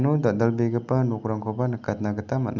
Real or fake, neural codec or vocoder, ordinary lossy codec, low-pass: real; none; none; 7.2 kHz